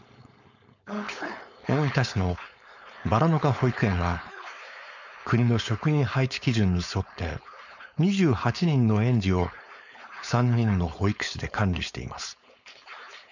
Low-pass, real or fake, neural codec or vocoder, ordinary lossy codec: 7.2 kHz; fake; codec, 16 kHz, 4.8 kbps, FACodec; none